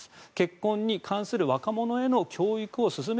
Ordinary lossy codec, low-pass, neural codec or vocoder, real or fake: none; none; none; real